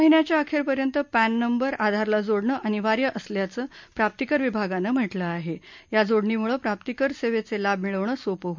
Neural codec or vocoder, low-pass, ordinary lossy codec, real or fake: none; 7.2 kHz; MP3, 64 kbps; real